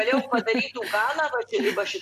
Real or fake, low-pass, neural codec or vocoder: real; 14.4 kHz; none